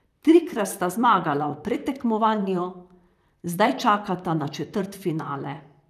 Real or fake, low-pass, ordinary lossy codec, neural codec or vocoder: fake; 14.4 kHz; none; vocoder, 44.1 kHz, 128 mel bands, Pupu-Vocoder